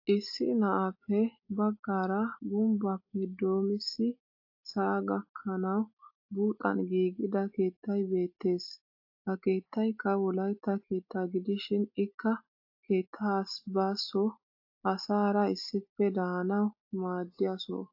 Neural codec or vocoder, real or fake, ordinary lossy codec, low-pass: none; real; AAC, 48 kbps; 5.4 kHz